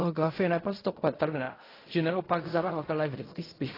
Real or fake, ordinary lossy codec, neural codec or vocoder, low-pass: fake; AAC, 24 kbps; codec, 16 kHz in and 24 kHz out, 0.4 kbps, LongCat-Audio-Codec, fine tuned four codebook decoder; 5.4 kHz